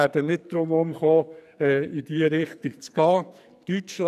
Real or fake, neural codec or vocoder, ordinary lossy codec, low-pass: fake; codec, 44.1 kHz, 2.6 kbps, SNAC; none; 14.4 kHz